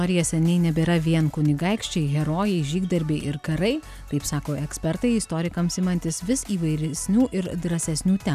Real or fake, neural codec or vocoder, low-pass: real; none; 14.4 kHz